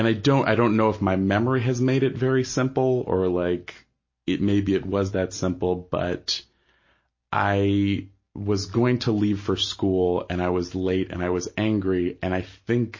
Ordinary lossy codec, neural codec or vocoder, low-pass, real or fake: MP3, 32 kbps; none; 7.2 kHz; real